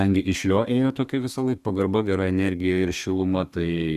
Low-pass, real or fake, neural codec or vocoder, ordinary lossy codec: 14.4 kHz; fake; codec, 32 kHz, 1.9 kbps, SNAC; Opus, 64 kbps